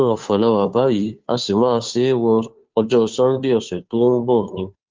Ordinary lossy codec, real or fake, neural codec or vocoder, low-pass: Opus, 32 kbps; fake; codec, 16 kHz, 2 kbps, FunCodec, trained on Chinese and English, 25 frames a second; 7.2 kHz